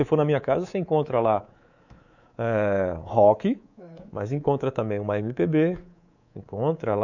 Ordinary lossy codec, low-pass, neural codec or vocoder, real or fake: none; 7.2 kHz; codec, 24 kHz, 3.1 kbps, DualCodec; fake